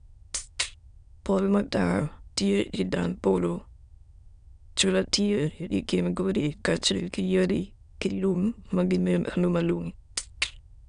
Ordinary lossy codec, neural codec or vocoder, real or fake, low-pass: none; autoencoder, 22.05 kHz, a latent of 192 numbers a frame, VITS, trained on many speakers; fake; 9.9 kHz